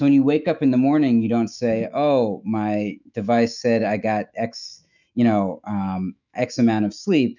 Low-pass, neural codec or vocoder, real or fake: 7.2 kHz; autoencoder, 48 kHz, 128 numbers a frame, DAC-VAE, trained on Japanese speech; fake